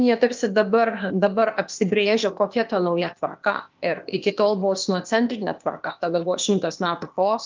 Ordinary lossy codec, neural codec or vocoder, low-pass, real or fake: Opus, 24 kbps; codec, 16 kHz, 0.8 kbps, ZipCodec; 7.2 kHz; fake